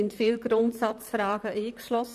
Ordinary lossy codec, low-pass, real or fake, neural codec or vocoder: none; 14.4 kHz; fake; vocoder, 44.1 kHz, 128 mel bands, Pupu-Vocoder